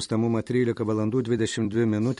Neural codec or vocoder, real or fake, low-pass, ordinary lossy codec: vocoder, 44.1 kHz, 128 mel bands every 256 samples, BigVGAN v2; fake; 19.8 kHz; MP3, 48 kbps